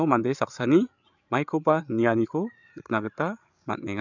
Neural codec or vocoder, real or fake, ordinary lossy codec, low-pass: codec, 16 kHz, 8 kbps, FreqCodec, larger model; fake; none; 7.2 kHz